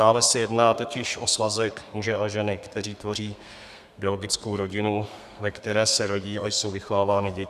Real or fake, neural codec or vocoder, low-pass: fake; codec, 32 kHz, 1.9 kbps, SNAC; 14.4 kHz